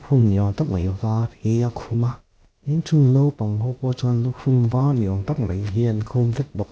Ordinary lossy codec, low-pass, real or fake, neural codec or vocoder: none; none; fake; codec, 16 kHz, about 1 kbps, DyCAST, with the encoder's durations